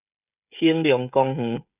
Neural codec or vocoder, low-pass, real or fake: codec, 16 kHz, 16 kbps, FreqCodec, smaller model; 3.6 kHz; fake